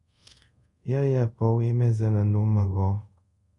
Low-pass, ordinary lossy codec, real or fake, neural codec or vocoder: 10.8 kHz; MP3, 96 kbps; fake; codec, 24 kHz, 0.5 kbps, DualCodec